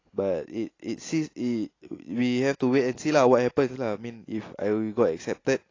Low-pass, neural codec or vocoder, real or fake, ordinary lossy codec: 7.2 kHz; none; real; AAC, 32 kbps